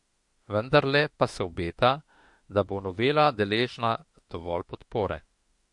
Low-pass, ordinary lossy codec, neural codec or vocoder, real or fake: 10.8 kHz; MP3, 48 kbps; autoencoder, 48 kHz, 32 numbers a frame, DAC-VAE, trained on Japanese speech; fake